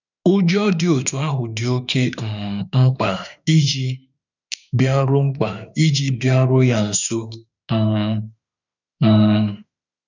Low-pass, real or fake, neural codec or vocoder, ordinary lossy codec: 7.2 kHz; fake; autoencoder, 48 kHz, 32 numbers a frame, DAC-VAE, trained on Japanese speech; none